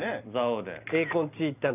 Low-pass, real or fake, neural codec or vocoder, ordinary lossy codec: 3.6 kHz; real; none; none